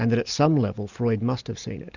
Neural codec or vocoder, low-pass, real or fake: none; 7.2 kHz; real